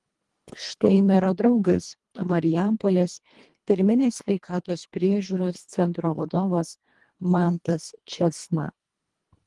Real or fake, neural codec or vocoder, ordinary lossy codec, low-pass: fake; codec, 24 kHz, 1.5 kbps, HILCodec; Opus, 24 kbps; 10.8 kHz